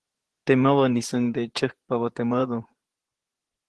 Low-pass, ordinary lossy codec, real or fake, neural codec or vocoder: 10.8 kHz; Opus, 16 kbps; fake; vocoder, 24 kHz, 100 mel bands, Vocos